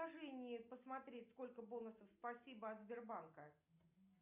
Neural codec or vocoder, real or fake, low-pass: none; real; 3.6 kHz